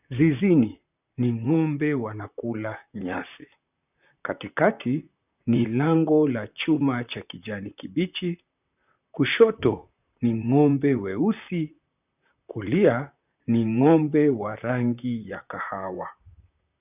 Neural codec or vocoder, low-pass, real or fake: vocoder, 44.1 kHz, 128 mel bands, Pupu-Vocoder; 3.6 kHz; fake